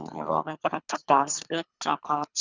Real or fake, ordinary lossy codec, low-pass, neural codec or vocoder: fake; Opus, 64 kbps; 7.2 kHz; codec, 24 kHz, 3 kbps, HILCodec